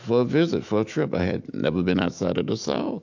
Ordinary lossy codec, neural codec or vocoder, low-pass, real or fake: AAC, 48 kbps; autoencoder, 48 kHz, 128 numbers a frame, DAC-VAE, trained on Japanese speech; 7.2 kHz; fake